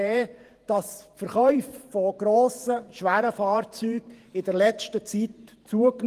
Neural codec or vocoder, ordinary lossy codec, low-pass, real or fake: vocoder, 48 kHz, 128 mel bands, Vocos; Opus, 24 kbps; 14.4 kHz; fake